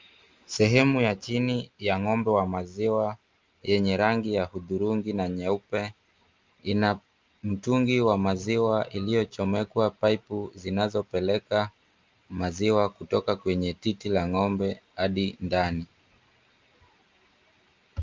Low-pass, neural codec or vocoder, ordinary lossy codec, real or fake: 7.2 kHz; none; Opus, 32 kbps; real